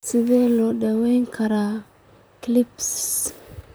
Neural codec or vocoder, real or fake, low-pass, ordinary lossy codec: vocoder, 44.1 kHz, 128 mel bands, Pupu-Vocoder; fake; none; none